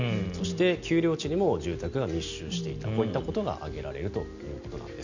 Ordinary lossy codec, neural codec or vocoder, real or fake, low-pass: none; none; real; 7.2 kHz